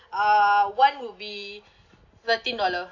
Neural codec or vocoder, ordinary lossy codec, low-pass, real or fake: none; AAC, 48 kbps; 7.2 kHz; real